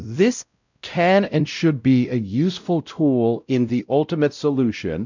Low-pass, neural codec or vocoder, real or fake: 7.2 kHz; codec, 16 kHz, 0.5 kbps, X-Codec, WavLM features, trained on Multilingual LibriSpeech; fake